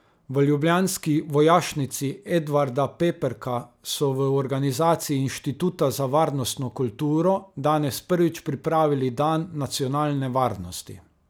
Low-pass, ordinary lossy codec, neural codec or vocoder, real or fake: none; none; none; real